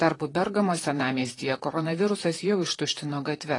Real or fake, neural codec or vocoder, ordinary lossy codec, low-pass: fake; vocoder, 44.1 kHz, 128 mel bands, Pupu-Vocoder; AAC, 32 kbps; 10.8 kHz